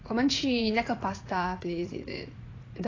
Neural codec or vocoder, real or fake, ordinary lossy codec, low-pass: vocoder, 44.1 kHz, 80 mel bands, Vocos; fake; AAC, 32 kbps; 7.2 kHz